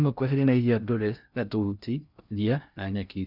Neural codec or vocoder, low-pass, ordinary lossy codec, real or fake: codec, 16 kHz in and 24 kHz out, 0.6 kbps, FocalCodec, streaming, 2048 codes; 5.4 kHz; none; fake